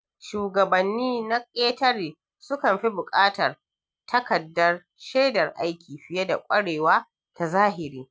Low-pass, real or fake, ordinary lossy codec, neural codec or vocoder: none; real; none; none